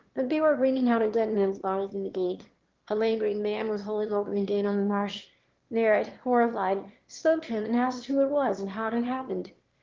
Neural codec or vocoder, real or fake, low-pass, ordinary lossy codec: autoencoder, 22.05 kHz, a latent of 192 numbers a frame, VITS, trained on one speaker; fake; 7.2 kHz; Opus, 16 kbps